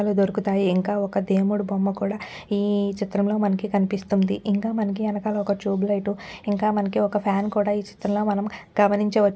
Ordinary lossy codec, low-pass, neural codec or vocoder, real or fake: none; none; none; real